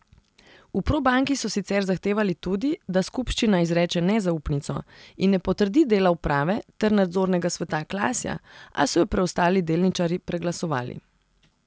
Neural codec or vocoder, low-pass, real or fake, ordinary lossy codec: none; none; real; none